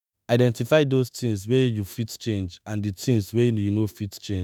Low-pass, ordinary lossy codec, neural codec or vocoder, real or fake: none; none; autoencoder, 48 kHz, 32 numbers a frame, DAC-VAE, trained on Japanese speech; fake